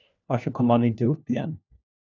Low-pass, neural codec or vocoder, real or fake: 7.2 kHz; codec, 16 kHz, 1 kbps, FunCodec, trained on LibriTTS, 50 frames a second; fake